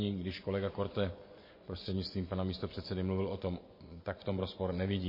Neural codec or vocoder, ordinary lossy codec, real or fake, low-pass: vocoder, 24 kHz, 100 mel bands, Vocos; MP3, 24 kbps; fake; 5.4 kHz